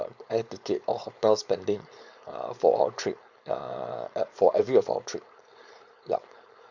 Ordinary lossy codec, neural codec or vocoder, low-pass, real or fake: Opus, 64 kbps; codec, 16 kHz, 4.8 kbps, FACodec; 7.2 kHz; fake